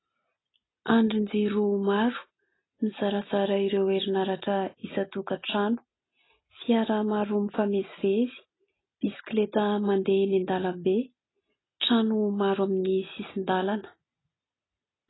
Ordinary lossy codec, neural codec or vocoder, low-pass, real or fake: AAC, 16 kbps; none; 7.2 kHz; real